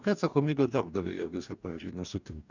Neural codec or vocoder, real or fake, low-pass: codec, 44.1 kHz, 2.6 kbps, DAC; fake; 7.2 kHz